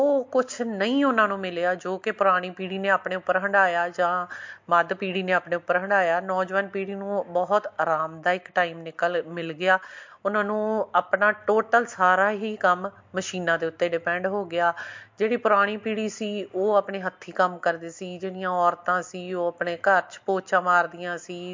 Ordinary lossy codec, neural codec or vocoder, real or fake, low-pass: MP3, 48 kbps; none; real; 7.2 kHz